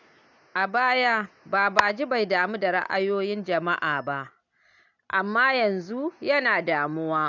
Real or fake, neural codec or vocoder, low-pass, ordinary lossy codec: real; none; 7.2 kHz; none